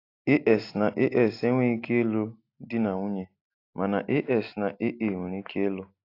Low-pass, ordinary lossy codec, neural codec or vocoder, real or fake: 5.4 kHz; AAC, 32 kbps; none; real